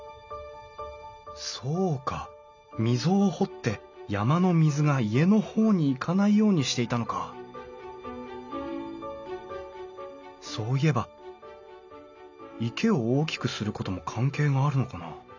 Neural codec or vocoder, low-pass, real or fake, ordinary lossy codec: none; 7.2 kHz; real; none